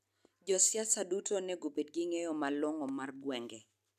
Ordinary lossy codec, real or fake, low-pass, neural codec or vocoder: none; real; 14.4 kHz; none